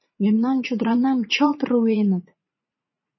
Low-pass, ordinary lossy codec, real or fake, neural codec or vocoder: 7.2 kHz; MP3, 24 kbps; fake; vocoder, 44.1 kHz, 80 mel bands, Vocos